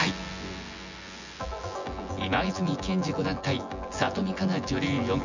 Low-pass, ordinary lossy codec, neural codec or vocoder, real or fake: 7.2 kHz; Opus, 64 kbps; vocoder, 24 kHz, 100 mel bands, Vocos; fake